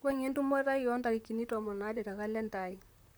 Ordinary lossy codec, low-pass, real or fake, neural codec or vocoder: none; none; fake; vocoder, 44.1 kHz, 128 mel bands, Pupu-Vocoder